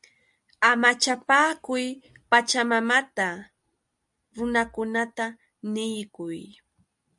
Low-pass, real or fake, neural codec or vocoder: 10.8 kHz; real; none